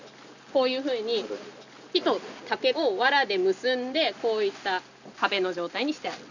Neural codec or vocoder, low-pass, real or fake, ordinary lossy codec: none; 7.2 kHz; real; none